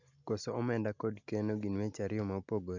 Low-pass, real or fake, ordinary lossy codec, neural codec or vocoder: 7.2 kHz; real; none; none